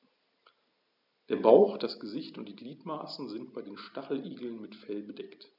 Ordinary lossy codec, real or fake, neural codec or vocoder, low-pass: none; real; none; 5.4 kHz